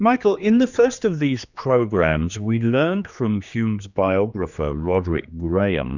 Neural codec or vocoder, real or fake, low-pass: codec, 16 kHz, 2 kbps, X-Codec, HuBERT features, trained on general audio; fake; 7.2 kHz